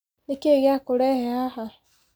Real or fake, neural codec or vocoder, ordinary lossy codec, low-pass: real; none; none; none